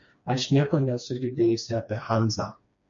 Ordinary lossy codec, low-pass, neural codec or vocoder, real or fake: MP3, 48 kbps; 7.2 kHz; codec, 16 kHz, 2 kbps, FreqCodec, smaller model; fake